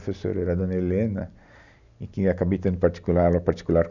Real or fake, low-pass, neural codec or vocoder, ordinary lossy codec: real; 7.2 kHz; none; none